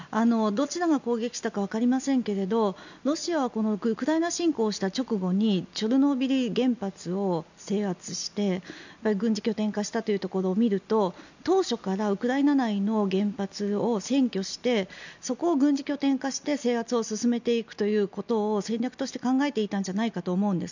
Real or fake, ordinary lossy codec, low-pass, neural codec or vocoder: real; none; 7.2 kHz; none